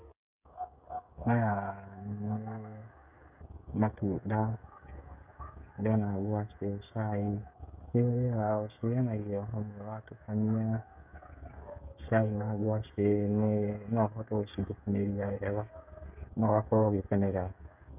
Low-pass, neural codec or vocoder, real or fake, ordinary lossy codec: 3.6 kHz; codec, 16 kHz, 16 kbps, FreqCodec, smaller model; fake; none